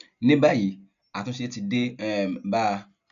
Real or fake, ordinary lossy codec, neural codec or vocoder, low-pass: real; none; none; 7.2 kHz